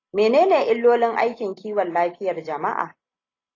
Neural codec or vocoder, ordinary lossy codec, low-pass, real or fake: none; AAC, 32 kbps; 7.2 kHz; real